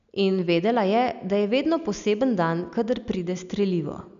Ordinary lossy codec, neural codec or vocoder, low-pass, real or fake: none; none; 7.2 kHz; real